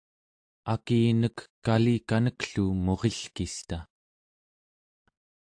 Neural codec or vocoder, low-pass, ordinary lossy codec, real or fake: none; 9.9 kHz; AAC, 64 kbps; real